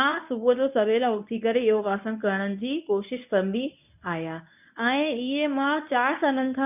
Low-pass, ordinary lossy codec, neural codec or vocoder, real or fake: 3.6 kHz; none; codec, 24 kHz, 0.9 kbps, WavTokenizer, medium speech release version 1; fake